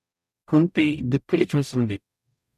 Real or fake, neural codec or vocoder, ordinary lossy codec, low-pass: fake; codec, 44.1 kHz, 0.9 kbps, DAC; none; 14.4 kHz